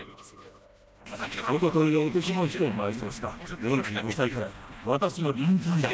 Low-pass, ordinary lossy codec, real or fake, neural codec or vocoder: none; none; fake; codec, 16 kHz, 1 kbps, FreqCodec, smaller model